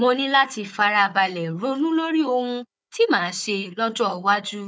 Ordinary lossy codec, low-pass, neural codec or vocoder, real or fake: none; none; codec, 16 kHz, 4 kbps, FunCodec, trained on Chinese and English, 50 frames a second; fake